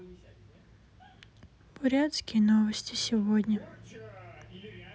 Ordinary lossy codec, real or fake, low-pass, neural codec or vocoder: none; real; none; none